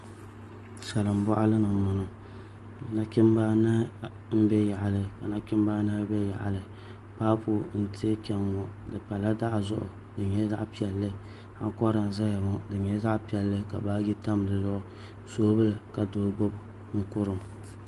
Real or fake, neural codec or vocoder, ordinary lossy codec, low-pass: real; none; Opus, 24 kbps; 10.8 kHz